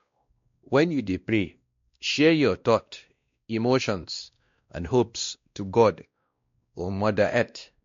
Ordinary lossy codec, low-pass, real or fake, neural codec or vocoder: MP3, 48 kbps; 7.2 kHz; fake; codec, 16 kHz, 1 kbps, X-Codec, WavLM features, trained on Multilingual LibriSpeech